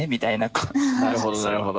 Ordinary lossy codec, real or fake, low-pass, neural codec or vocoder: none; real; none; none